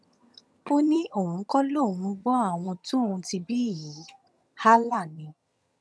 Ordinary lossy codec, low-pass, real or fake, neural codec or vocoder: none; none; fake; vocoder, 22.05 kHz, 80 mel bands, HiFi-GAN